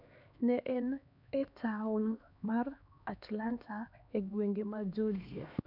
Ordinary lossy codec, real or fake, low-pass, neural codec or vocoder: none; fake; 5.4 kHz; codec, 16 kHz, 2 kbps, X-Codec, HuBERT features, trained on LibriSpeech